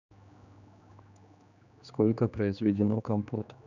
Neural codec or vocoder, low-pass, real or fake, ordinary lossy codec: codec, 16 kHz, 2 kbps, X-Codec, HuBERT features, trained on general audio; 7.2 kHz; fake; none